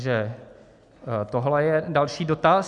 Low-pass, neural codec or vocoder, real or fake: 10.8 kHz; none; real